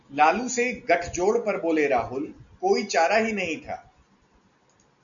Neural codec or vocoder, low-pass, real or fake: none; 7.2 kHz; real